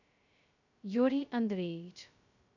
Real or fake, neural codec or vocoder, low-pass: fake; codec, 16 kHz, 0.2 kbps, FocalCodec; 7.2 kHz